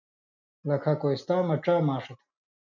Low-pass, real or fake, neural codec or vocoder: 7.2 kHz; real; none